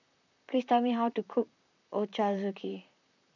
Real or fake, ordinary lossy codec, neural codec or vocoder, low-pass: fake; none; vocoder, 44.1 kHz, 128 mel bands, Pupu-Vocoder; 7.2 kHz